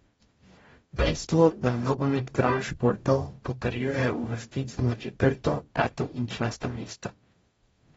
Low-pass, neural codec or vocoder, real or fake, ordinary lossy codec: 19.8 kHz; codec, 44.1 kHz, 0.9 kbps, DAC; fake; AAC, 24 kbps